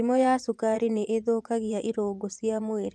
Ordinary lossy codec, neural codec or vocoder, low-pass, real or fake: none; vocoder, 24 kHz, 100 mel bands, Vocos; none; fake